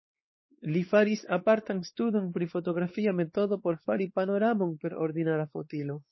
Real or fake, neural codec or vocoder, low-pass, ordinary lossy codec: fake; codec, 16 kHz, 4 kbps, X-Codec, WavLM features, trained on Multilingual LibriSpeech; 7.2 kHz; MP3, 24 kbps